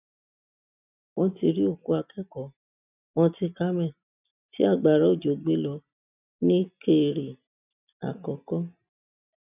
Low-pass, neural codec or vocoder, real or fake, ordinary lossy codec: 3.6 kHz; none; real; none